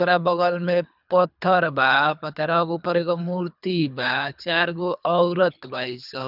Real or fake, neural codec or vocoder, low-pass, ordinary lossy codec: fake; codec, 24 kHz, 3 kbps, HILCodec; 5.4 kHz; none